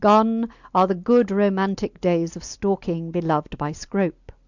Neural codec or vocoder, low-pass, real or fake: none; 7.2 kHz; real